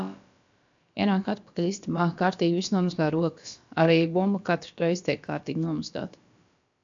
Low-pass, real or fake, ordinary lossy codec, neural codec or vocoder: 7.2 kHz; fake; AAC, 64 kbps; codec, 16 kHz, about 1 kbps, DyCAST, with the encoder's durations